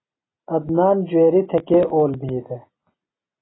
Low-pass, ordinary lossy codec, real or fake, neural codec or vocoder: 7.2 kHz; AAC, 16 kbps; real; none